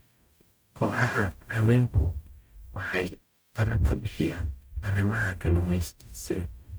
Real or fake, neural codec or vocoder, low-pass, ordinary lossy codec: fake; codec, 44.1 kHz, 0.9 kbps, DAC; none; none